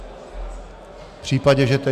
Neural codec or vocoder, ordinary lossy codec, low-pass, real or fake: none; AAC, 96 kbps; 14.4 kHz; real